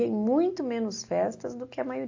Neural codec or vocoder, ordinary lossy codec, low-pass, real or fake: none; AAC, 48 kbps; 7.2 kHz; real